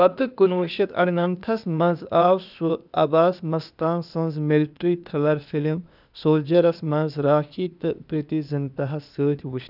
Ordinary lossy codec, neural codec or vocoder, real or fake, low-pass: none; codec, 16 kHz, 0.8 kbps, ZipCodec; fake; 5.4 kHz